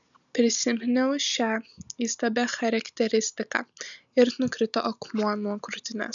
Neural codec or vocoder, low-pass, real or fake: none; 7.2 kHz; real